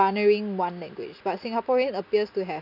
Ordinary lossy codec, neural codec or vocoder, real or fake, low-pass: none; none; real; 5.4 kHz